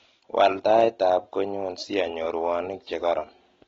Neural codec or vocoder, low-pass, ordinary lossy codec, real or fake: none; 7.2 kHz; AAC, 24 kbps; real